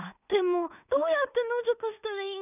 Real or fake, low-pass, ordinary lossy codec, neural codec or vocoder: fake; 3.6 kHz; none; codec, 16 kHz in and 24 kHz out, 0.4 kbps, LongCat-Audio-Codec, two codebook decoder